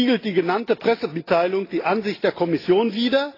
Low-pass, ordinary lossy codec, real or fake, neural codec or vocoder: 5.4 kHz; AAC, 24 kbps; real; none